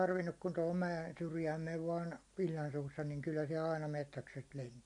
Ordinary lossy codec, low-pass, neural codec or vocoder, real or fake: MP3, 48 kbps; 10.8 kHz; none; real